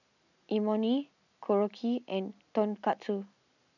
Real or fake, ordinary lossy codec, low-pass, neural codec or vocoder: real; none; 7.2 kHz; none